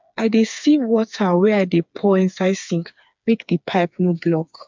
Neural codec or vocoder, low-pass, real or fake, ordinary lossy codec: codec, 16 kHz, 4 kbps, FreqCodec, smaller model; 7.2 kHz; fake; MP3, 64 kbps